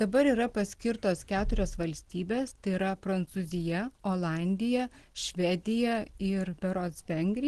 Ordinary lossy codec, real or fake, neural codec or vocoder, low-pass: Opus, 16 kbps; real; none; 10.8 kHz